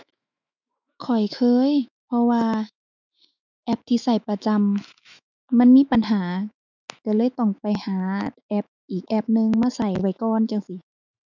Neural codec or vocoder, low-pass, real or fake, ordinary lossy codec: autoencoder, 48 kHz, 128 numbers a frame, DAC-VAE, trained on Japanese speech; 7.2 kHz; fake; none